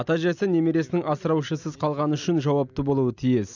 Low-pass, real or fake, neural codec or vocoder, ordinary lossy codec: 7.2 kHz; real; none; none